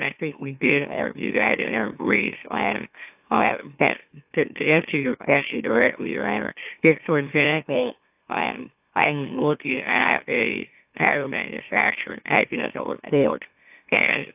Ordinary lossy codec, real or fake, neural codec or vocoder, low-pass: AAC, 32 kbps; fake; autoencoder, 44.1 kHz, a latent of 192 numbers a frame, MeloTTS; 3.6 kHz